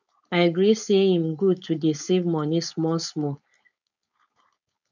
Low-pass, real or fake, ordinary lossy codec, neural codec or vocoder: 7.2 kHz; fake; none; codec, 16 kHz, 4.8 kbps, FACodec